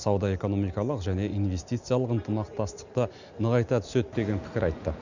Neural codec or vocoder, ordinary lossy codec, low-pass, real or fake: none; none; 7.2 kHz; real